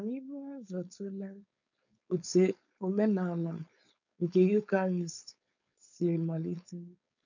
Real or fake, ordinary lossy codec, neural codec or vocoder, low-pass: fake; none; codec, 16 kHz, 4.8 kbps, FACodec; 7.2 kHz